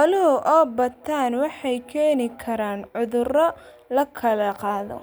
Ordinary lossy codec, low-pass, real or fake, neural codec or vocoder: none; none; real; none